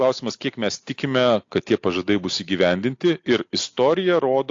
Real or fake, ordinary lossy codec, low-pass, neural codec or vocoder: real; AAC, 48 kbps; 7.2 kHz; none